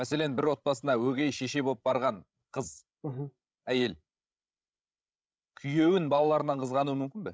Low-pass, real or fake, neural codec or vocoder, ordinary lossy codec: none; fake; codec, 16 kHz, 16 kbps, FreqCodec, larger model; none